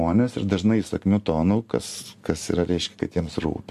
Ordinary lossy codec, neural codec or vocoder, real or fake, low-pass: MP3, 64 kbps; none; real; 14.4 kHz